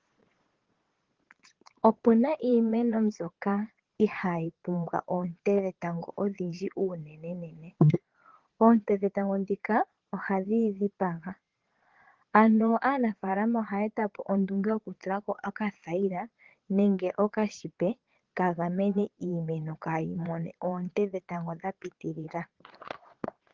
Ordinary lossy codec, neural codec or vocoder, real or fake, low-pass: Opus, 16 kbps; vocoder, 22.05 kHz, 80 mel bands, Vocos; fake; 7.2 kHz